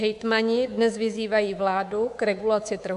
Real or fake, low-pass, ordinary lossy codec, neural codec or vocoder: fake; 10.8 kHz; AAC, 96 kbps; codec, 24 kHz, 3.1 kbps, DualCodec